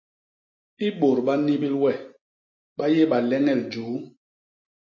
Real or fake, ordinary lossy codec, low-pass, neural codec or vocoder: real; MP3, 32 kbps; 7.2 kHz; none